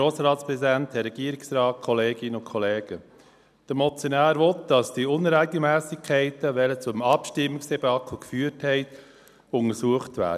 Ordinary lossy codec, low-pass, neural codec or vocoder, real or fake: none; 14.4 kHz; none; real